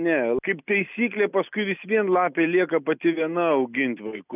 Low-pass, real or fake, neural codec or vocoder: 3.6 kHz; real; none